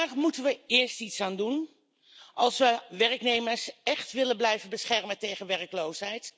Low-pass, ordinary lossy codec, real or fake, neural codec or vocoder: none; none; real; none